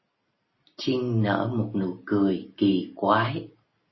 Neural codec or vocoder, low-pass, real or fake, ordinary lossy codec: none; 7.2 kHz; real; MP3, 24 kbps